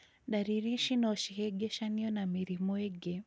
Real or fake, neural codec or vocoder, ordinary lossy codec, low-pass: real; none; none; none